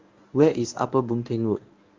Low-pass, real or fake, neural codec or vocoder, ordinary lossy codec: 7.2 kHz; fake; codec, 24 kHz, 0.9 kbps, WavTokenizer, medium speech release version 1; Opus, 32 kbps